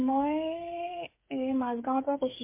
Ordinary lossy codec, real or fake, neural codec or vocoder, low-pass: MP3, 24 kbps; fake; codec, 16 kHz, 8 kbps, FreqCodec, smaller model; 3.6 kHz